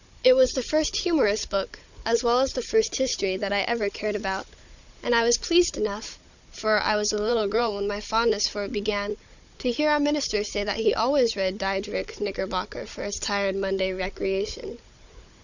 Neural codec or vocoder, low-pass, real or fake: codec, 16 kHz, 16 kbps, FunCodec, trained on Chinese and English, 50 frames a second; 7.2 kHz; fake